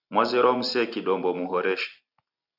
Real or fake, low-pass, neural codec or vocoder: real; 5.4 kHz; none